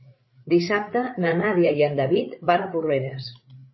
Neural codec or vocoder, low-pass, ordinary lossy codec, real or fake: codec, 16 kHz, 8 kbps, FreqCodec, larger model; 7.2 kHz; MP3, 24 kbps; fake